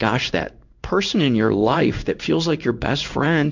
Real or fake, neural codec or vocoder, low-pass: fake; codec, 16 kHz in and 24 kHz out, 1 kbps, XY-Tokenizer; 7.2 kHz